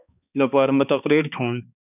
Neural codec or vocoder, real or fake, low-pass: codec, 16 kHz, 2 kbps, X-Codec, HuBERT features, trained on balanced general audio; fake; 3.6 kHz